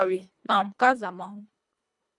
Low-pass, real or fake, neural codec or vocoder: 10.8 kHz; fake; codec, 24 kHz, 1.5 kbps, HILCodec